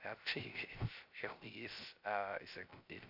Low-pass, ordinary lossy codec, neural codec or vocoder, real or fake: 5.4 kHz; AAC, 48 kbps; codec, 16 kHz, 0.3 kbps, FocalCodec; fake